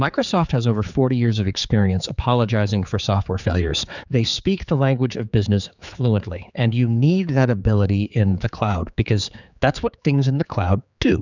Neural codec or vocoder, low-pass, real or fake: codec, 16 kHz, 4 kbps, X-Codec, HuBERT features, trained on general audio; 7.2 kHz; fake